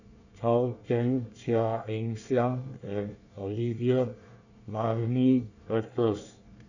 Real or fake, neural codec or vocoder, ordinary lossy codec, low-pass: fake; codec, 24 kHz, 1 kbps, SNAC; none; 7.2 kHz